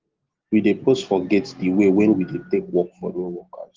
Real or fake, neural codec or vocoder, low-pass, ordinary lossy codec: real; none; 7.2 kHz; Opus, 16 kbps